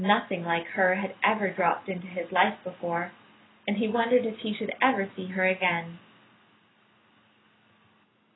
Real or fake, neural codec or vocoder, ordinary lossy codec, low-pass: real; none; AAC, 16 kbps; 7.2 kHz